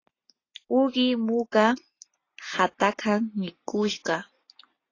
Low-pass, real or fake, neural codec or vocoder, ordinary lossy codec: 7.2 kHz; real; none; AAC, 32 kbps